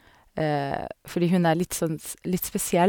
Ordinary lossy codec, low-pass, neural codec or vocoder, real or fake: none; none; none; real